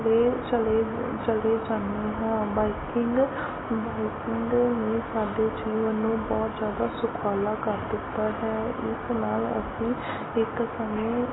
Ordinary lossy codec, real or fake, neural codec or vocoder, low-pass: AAC, 16 kbps; real; none; 7.2 kHz